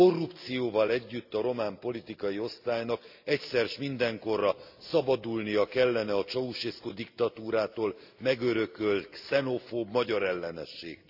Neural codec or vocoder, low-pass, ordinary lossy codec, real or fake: none; 5.4 kHz; AAC, 48 kbps; real